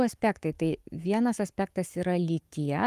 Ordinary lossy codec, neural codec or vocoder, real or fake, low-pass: Opus, 32 kbps; codec, 44.1 kHz, 7.8 kbps, Pupu-Codec; fake; 14.4 kHz